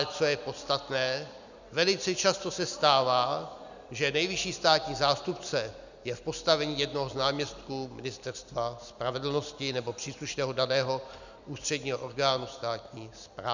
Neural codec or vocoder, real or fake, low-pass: none; real; 7.2 kHz